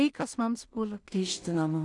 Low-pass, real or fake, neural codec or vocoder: 10.8 kHz; fake; codec, 16 kHz in and 24 kHz out, 0.4 kbps, LongCat-Audio-Codec, two codebook decoder